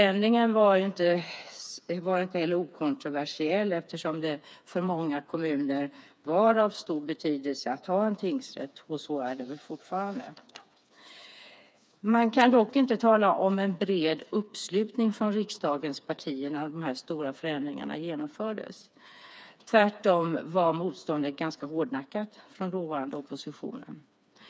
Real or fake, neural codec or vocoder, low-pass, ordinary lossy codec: fake; codec, 16 kHz, 4 kbps, FreqCodec, smaller model; none; none